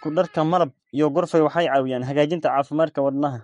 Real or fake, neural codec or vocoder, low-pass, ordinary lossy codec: fake; codec, 44.1 kHz, 7.8 kbps, Pupu-Codec; 19.8 kHz; MP3, 48 kbps